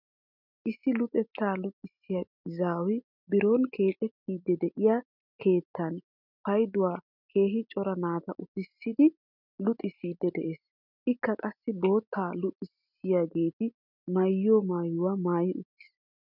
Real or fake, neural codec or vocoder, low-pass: real; none; 5.4 kHz